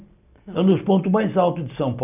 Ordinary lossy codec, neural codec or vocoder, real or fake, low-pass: none; none; real; 3.6 kHz